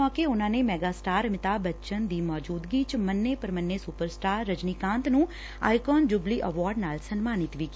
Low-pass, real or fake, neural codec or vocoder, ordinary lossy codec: none; real; none; none